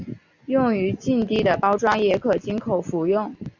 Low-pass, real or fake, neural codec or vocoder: 7.2 kHz; real; none